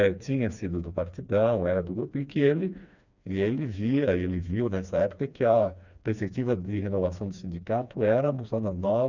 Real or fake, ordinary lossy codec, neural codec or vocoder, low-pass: fake; none; codec, 16 kHz, 2 kbps, FreqCodec, smaller model; 7.2 kHz